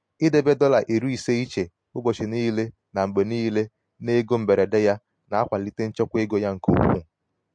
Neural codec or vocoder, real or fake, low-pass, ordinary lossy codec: none; real; 9.9 kHz; MP3, 48 kbps